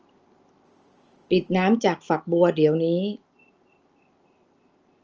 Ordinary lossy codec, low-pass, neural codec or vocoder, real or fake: Opus, 24 kbps; 7.2 kHz; none; real